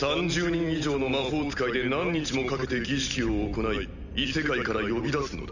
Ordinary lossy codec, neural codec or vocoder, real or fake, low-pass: none; none; real; 7.2 kHz